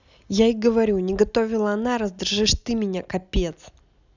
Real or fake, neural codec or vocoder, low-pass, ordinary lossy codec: real; none; 7.2 kHz; none